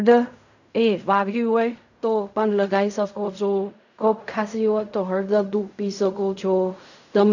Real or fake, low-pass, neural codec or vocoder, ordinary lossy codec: fake; 7.2 kHz; codec, 16 kHz in and 24 kHz out, 0.4 kbps, LongCat-Audio-Codec, fine tuned four codebook decoder; none